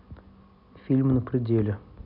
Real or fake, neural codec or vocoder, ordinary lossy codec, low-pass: real; none; none; 5.4 kHz